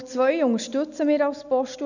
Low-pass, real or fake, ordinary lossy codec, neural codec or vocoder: 7.2 kHz; real; none; none